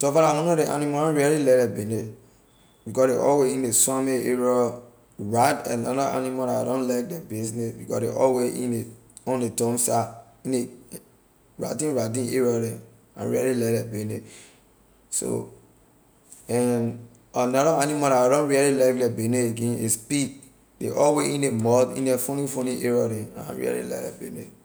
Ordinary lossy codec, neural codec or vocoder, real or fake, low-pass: none; none; real; none